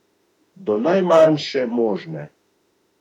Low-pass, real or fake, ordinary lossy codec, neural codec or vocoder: 19.8 kHz; fake; MP3, 96 kbps; autoencoder, 48 kHz, 32 numbers a frame, DAC-VAE, trained on Japanese speech